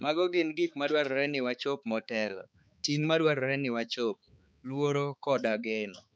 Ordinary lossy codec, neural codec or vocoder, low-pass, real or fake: none; codec, 16 kHz, 4 kbps, X-Codec, HuBERT features, trained on balanced general audio; none; fake